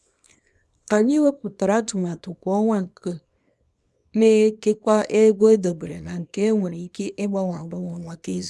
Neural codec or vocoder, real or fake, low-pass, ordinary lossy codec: codec, 24 kHz, 0.9 kbps, WavTokenizer, small release; fake; none; none